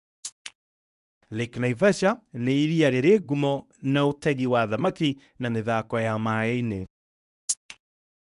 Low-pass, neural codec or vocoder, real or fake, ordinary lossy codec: 10.8 kHz; codec, 24 kHz, 0.9 kbps, WavTokenizer, medium speech release version 1; fake; none